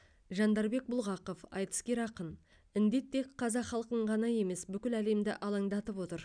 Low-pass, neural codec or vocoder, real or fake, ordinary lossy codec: 9.9 kHz; none; real; none